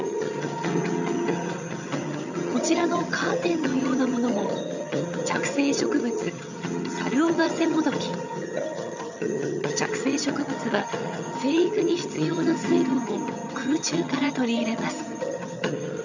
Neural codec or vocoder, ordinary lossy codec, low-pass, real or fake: vocoder, 22.05 kHz, 80 mel bands, HiFi-GAN; none; 7.2 kHz; fake